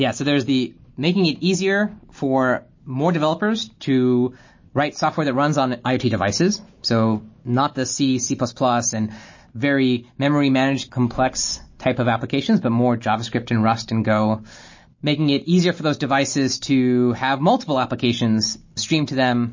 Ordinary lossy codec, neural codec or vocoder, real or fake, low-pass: MP3, 32 kbps; none; real; 7.2 kHz